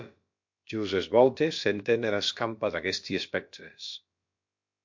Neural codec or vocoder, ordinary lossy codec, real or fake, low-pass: codec, 16 kHz, about 1 kbps, DyCAST, with the encoder's durations; MP3, 48 kbps; fake; 7.2 kHz